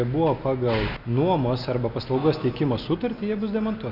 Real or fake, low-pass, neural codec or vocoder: real; 5.4 kHz; none